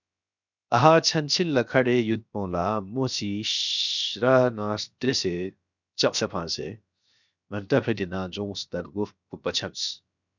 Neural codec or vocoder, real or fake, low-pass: codec, 16 kHz, 0.7 kbps, FocalCodec; fake; 7.2 kHz